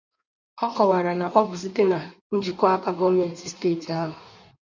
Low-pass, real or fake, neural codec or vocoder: 7.2 kHz; fake; codec, 16 kHz in and 24 kHz out, 1.1 kbps, FireRedTTS-2 codec